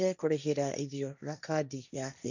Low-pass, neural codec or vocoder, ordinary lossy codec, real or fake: 7.2 kHz; codec, 16 kHz, 1.1 kbps, Voila-Tokenizer; none; fake